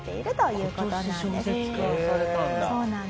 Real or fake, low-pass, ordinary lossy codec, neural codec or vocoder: real; none; none; none